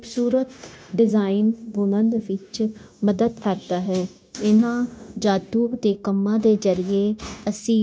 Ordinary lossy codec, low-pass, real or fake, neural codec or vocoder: none; none; fake; codec, 16 kHz, 0.9 kbps, LongCat-Audio-Codec